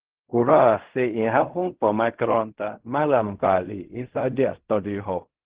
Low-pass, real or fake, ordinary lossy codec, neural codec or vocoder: 3.6 kHz; fake; Opus, 16 kbps; codec, 16 kHz in and 24 kHz out, 0.4 kbps, LongCat-Audio-Codec, fine tuned four codebook decoder